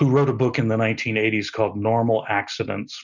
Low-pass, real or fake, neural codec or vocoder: 7.2 kHz; real; none